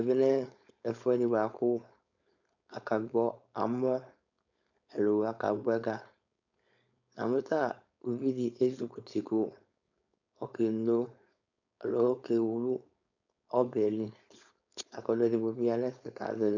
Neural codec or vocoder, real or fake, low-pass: codec, 16 kHz, 4.8 kbps, FACodec; fake; 7.2 kHz